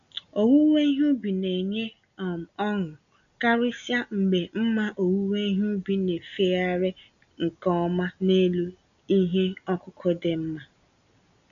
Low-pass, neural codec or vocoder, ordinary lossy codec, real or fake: 7.2 kHz; none; none; real